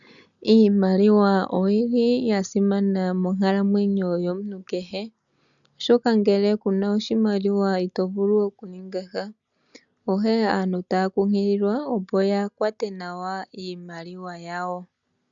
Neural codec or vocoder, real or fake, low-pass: none; real; 7.2 kHz